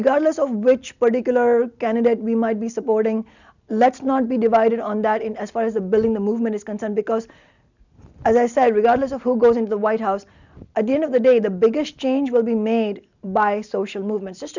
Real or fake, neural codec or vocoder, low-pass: real; none; 7.2 kHz